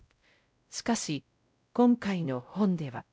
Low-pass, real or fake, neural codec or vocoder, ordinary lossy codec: none; fake; codec, 16 kHz, 0.5 kbps, X-Codec, WavLM features, trained on Multilingual LibriSpeech; none